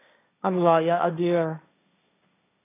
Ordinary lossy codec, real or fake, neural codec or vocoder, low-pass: AAC, 24 kbps; fake; codec, 16 kHz, 1.1 kbps, Voila-Tokenizer; 3.6 kHz